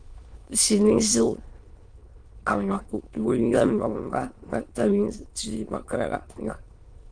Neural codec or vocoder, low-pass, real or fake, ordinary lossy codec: autoencoder, 22.05 kHz, a latent of 192 numbers a frame, VITS, trained on many speakers; 9.9 kHz; fake; Opus, 32 kbps